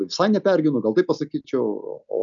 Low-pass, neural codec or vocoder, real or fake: 7.2 kHz; none; real